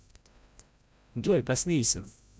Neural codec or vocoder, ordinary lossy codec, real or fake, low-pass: codec, 16 kHz, 0.5 kbps, FreqCodec, larger model; none; fake; none